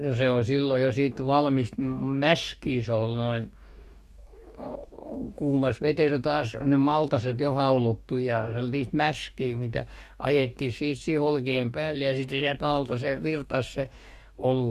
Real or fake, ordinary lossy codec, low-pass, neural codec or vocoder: fake; Opus, 64 kbps; 14.4 kHz; codec, 44.1 kHz, 2.6 kbps, DAC